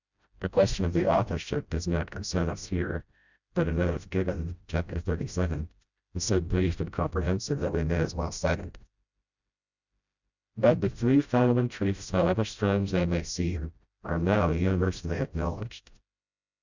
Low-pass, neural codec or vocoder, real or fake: 7.2 kHz; codec, 16 kHz, 0.5 kbps, FreqCodec, smaller model; fake